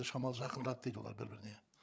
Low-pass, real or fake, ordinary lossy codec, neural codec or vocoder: none; fake; none; codec, 16 kHz, 8 kbps, FreqCodec, larger model